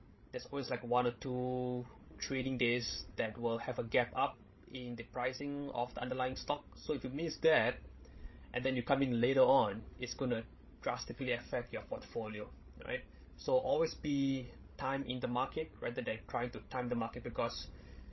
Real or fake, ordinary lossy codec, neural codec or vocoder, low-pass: fake; MP3, 24 kbps; codec, 16 kHz, 16 kbps, FreqCodec, larger model; 7.2 kHz